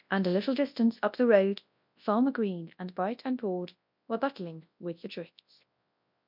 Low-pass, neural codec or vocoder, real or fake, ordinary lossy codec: 5.4 kHz; codec, 24 kHz, 0.9 kbps, WavTokenizer, large speech release; fake; MP3, 48 kbps